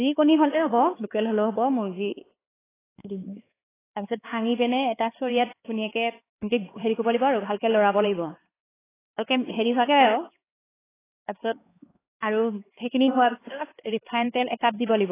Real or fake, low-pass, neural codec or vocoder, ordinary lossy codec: fake; 3.6 kHz; codec, 16 kHz, 4 kbps, X-Codec, HuBERT features, trained on LibriSpeech; AAC, 16 kbps